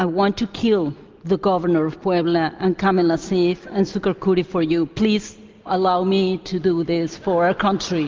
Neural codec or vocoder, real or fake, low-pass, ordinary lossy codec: none; real; 7.2 kHz; Opus, 24 kbps